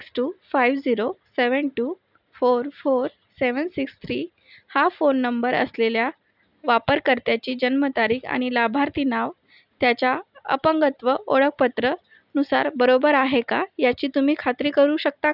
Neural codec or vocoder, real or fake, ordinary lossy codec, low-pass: none; real; none; 5.4 kHz